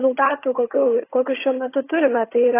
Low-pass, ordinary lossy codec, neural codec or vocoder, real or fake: 3.6 kHz; AAC, 24 kbps; vocoder, 22.05 kHz, 80 mel bands, HiFi-GAN; fake